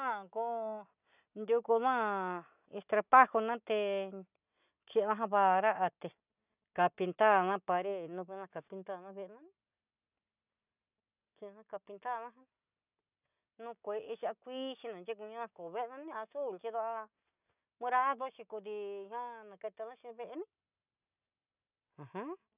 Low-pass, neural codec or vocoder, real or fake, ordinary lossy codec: 3.6 kHz; none; real; none